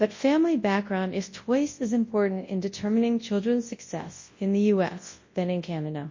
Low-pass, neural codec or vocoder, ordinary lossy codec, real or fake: 7.2 kHz; codec, 24 kHz, 0.9 kbps, WavTokenizer, large speech release; MP3, 32 kbps; fake